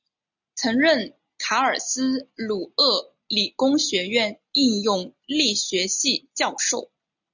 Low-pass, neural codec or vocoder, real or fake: 7.2 kHz; none; real